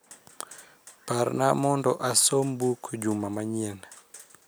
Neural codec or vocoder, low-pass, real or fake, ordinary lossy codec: none; none; real; none